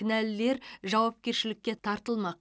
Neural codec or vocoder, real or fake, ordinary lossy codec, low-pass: none; real; none; none